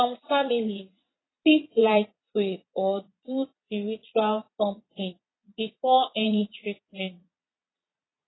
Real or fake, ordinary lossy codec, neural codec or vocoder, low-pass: fake; AAC, 16 kbps; vocoder, 22.05 kHz, 80 mel bands, Vocos; 7.2 kHz